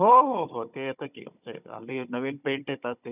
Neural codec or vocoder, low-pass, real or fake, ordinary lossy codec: codec, 16 kHz, 16 kbps, FunCodec, trained on Chinese and English, 50 frames a second; 3.6 kHz; fake; none